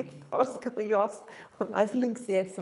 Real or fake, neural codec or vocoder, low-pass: fake; codec, 24 kHz, 3 kbps, HILCodec; 10.8 kHz